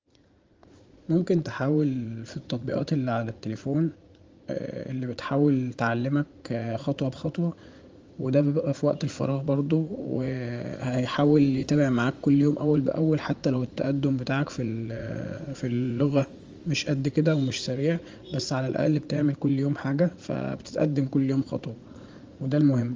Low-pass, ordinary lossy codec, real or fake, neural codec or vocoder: 7.2 kHz; Opus, 32 kbps; fake; vocoder, 44.1 kHz, 80 mel bands, Vocos